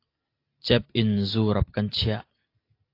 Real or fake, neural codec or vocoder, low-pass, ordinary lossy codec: real; none; 5.4 kHz; AAC, 32 kbps